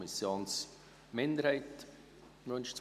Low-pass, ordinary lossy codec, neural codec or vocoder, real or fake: 14.4 kHz; none; none; real